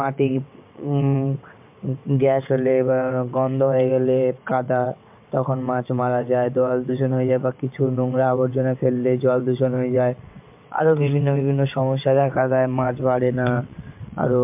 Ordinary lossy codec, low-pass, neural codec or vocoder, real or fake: MP3, 32 kbps; 3.6 kHz; vocoder, 22.05 kHz, 80 mel bands, WaveNeXt; fake